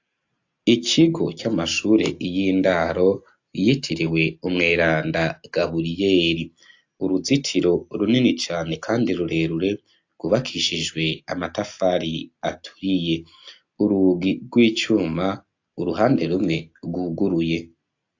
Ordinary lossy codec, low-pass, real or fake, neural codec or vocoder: AAC, 48 kbps; 7.2 kHz; real; none